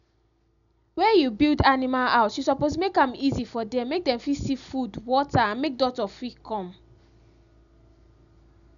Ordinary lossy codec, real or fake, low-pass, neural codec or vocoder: none; real; 7.2 kHz; none